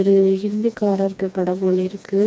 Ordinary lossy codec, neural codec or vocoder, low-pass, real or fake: none; codec, 16 kHz, 2 kbps, FreqCodec, smaller model; none; fake